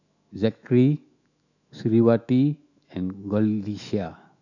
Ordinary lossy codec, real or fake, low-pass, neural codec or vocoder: none; fake; 7.2 kHz; autoencoder, 48 kHz, 128 numbers a frame, DAC-VAE, trained on Japanese speech